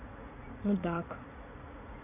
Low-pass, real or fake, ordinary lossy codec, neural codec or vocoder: 3.6 kHz; fake; none; vocoder, 44.1 kHz, 80 mel bands, Vocos